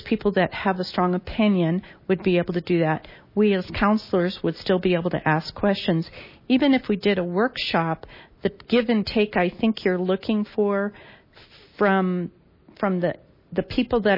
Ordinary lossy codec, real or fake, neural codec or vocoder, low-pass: MP3, 24 kbps; real; none; 5.4 kHz